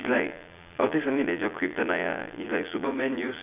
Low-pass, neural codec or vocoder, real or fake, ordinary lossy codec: 3.6 kHz; vocoder, 22.05 kHz, 80 mel bands, Vocos; fake; none